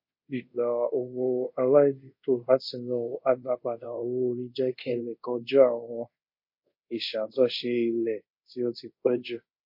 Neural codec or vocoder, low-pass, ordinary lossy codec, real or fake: codec, 24 kHz, 0.5 kbps, DualCodec; 5.4 kHz; MP3, 32 kbps; fake